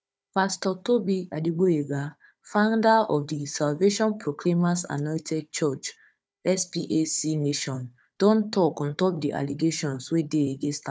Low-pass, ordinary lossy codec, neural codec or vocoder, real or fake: none; none; codec, 16 kHz, 4 kbps, FunCodec, trained on Chinese and English, 50 frames a second; fake